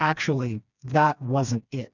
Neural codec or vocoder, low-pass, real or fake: codec, 16 kHz, 2 kbps, FreqCodec, smaller model; 7.2 kHz; fake